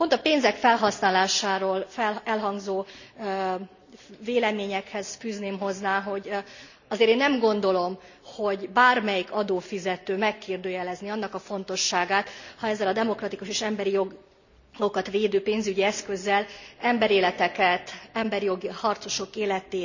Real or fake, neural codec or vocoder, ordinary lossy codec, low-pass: real; none; none; 7.2 kHz